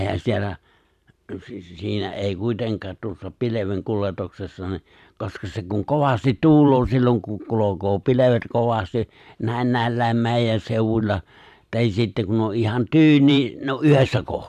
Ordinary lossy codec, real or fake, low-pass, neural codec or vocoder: AAC, 96 kbps; fake; 14.4 kHz; vocoder, 44.1 kHz, 128 mel bands every 512 samples, BigVGAN v2